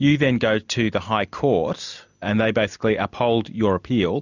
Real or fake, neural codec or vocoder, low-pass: real; none; 7.2 kHz